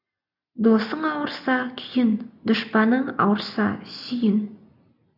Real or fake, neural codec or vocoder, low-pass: real; none; 5.4 kHz